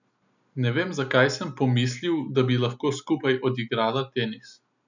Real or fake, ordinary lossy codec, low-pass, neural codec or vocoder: real; none; 7.2 kHz; none